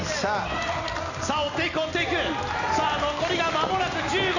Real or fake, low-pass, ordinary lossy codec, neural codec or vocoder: real; 7.2 kHz; none; none